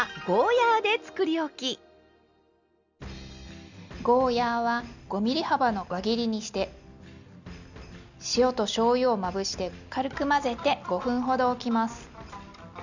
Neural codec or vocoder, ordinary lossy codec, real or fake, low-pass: none; none; real; 7.2 kHz